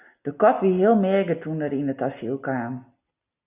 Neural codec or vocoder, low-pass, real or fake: none; 3.6 kHz; real